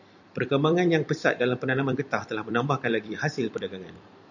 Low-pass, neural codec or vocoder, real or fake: 7.2 kHz; vocoder, 44.1 kHz, 128 mel bands every 256 samples, BigVGAN v2; fake